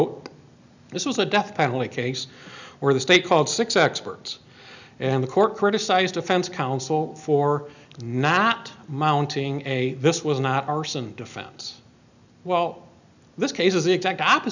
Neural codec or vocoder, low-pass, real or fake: none; 7.2 kHz; real